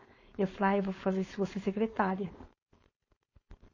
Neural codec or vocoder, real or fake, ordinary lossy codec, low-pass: codec, 16 kHz, 4.8 kbps, FACodec; fake; MP3, 32 kbps; 7.2 kHz